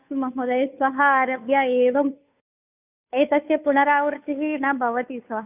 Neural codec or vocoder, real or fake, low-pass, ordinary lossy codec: codec, 16 kHz, 2 kbps, FunCodec, trained on Chinese and English, 25 frames a second; fake; 3.6 kHz; none